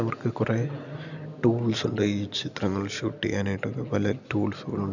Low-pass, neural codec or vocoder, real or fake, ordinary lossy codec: 7.2 kHz; none; real; none